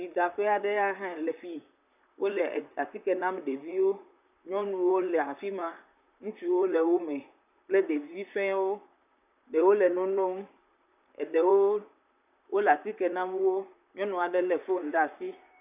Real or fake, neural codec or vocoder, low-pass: fake; vocoder, 44.1 kHz, 128 mel bands, Pupu-Vocoder; 3.6 kHz